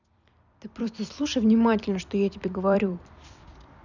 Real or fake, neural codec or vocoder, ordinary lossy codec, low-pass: fake; vocoder, 44.1 kHz, 128 mel bands every 256 samples, BigVGAN v2; none; 7.2 kHz